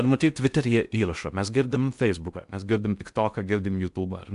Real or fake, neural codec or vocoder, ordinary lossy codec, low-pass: fake; codec, 16 kHz in and 24 kHz out, 0.6 kbps, FocalCodec, streaming, 4096 codes; MP3, 96 kbps; 10.8 kHz